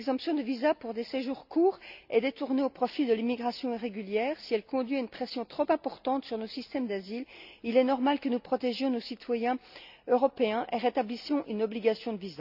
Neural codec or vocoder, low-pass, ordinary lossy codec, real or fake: none; 5.4 kHz; none; real